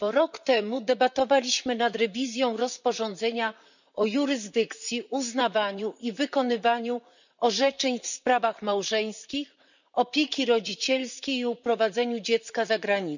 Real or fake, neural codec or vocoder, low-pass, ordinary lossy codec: fake; vocoder, 44.1 kHz, 128 mel bands, Pupu-Vocoder; 7.2 kHz; none